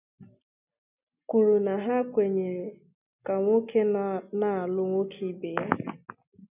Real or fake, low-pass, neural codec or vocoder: real; 3.6 kHz; none